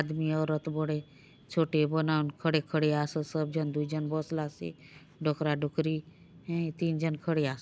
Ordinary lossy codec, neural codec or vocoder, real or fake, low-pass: none; none; real; none